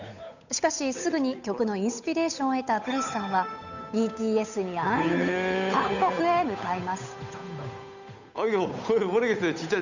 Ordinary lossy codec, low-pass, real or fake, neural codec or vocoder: none; 7.2 kHz; fake; codec, 16 kHz, 8 kbps, FunCodec, trained on Chinese and English, 25 frames a second